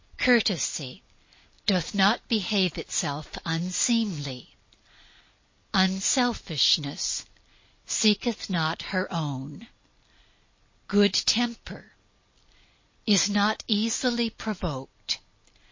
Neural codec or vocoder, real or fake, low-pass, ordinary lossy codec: none; real; 7.2 kHz; MP3, 32 kbps